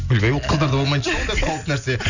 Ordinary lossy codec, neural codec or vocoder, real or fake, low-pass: none; none; real; 7.2 kHz